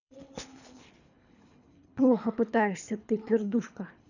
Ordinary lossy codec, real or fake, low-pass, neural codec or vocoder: none; fake; 7.2 kHz; codec, 24 kHz, 3 kbps, HILCodec